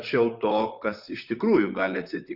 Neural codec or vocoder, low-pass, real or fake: vocoder, 44.1 kHz, 128 mel bands, Pupu-Vocoder; 5.4 kHz; fake